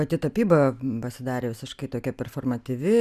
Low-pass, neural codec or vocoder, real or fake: 14.4 kHz; none; real